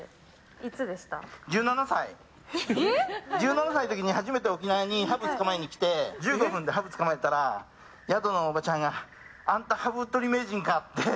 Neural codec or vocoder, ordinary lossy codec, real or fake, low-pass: none; none; real; none